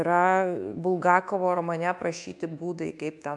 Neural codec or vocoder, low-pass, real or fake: codec, 24 kHz, 1.2 kbps, DualCodec; 10.8 kHz; fake